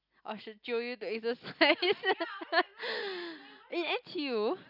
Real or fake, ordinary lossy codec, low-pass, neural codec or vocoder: real; none; 5.4 kHz; none